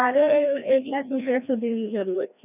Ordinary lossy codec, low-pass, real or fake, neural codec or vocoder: none; 3.6 kHz; fake; codec, 16 kHz, 1 kbps, FreqCodec, larger model